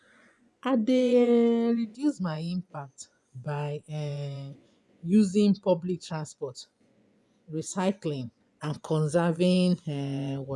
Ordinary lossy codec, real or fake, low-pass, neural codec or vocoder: none; fake; none; vocoder, 24 kHz, 100 mel bands, Vocos